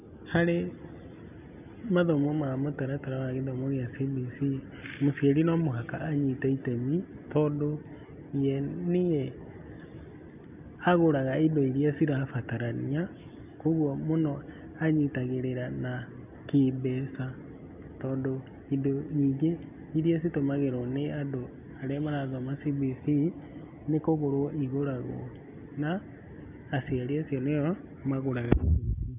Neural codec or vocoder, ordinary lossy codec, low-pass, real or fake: none; none; 3.6 kHz; real